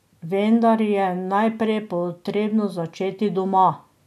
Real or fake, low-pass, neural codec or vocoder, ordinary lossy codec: real; 14.4 kHz; none; none